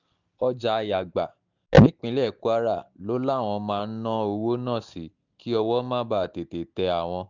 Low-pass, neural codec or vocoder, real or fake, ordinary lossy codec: 7.2 kHz; none; real; none